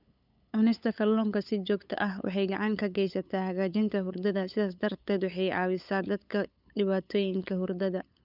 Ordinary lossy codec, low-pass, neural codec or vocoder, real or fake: none; 5.4 kHz; codec, 16 kHz, 8 kbps, FunCodec, trained on LibriTTS, 25 frames a second; fake